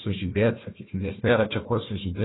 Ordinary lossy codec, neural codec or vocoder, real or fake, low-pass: AAC, 16 kbps; codec, 16 kHz, 1 kbps, FunCodec, trained on Chinese and English, 50 frames a second; fake; 7.2 kHz